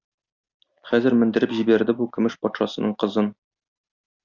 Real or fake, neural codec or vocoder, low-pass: real; none; 7.2 kHz